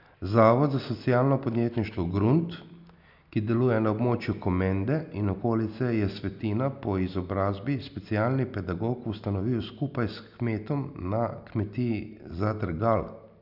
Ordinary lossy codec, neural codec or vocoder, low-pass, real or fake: none; none; 5.4 kHz; real